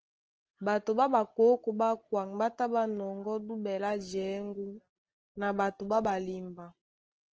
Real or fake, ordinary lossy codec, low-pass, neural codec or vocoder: real; Opus, 16 kbps; 7.2 kHz; none